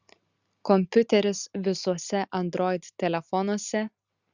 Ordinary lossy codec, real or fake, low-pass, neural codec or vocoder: Opus, 64 kbps; real; 7.2 kHz; none